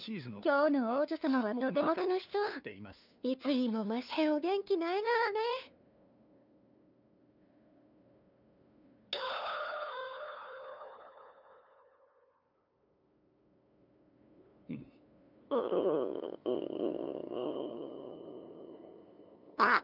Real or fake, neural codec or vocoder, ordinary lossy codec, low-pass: fake; codec, 16 kHz, 2 kbps, FunCodec, trained on LibriTTS, 25 frames a second; none; 5.4 kHz